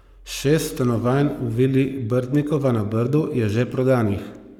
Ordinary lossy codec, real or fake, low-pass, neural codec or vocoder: none; fake; 19.8 kHz; codec, 44.1 kHz, 7.8 kbps, Pupu-Codec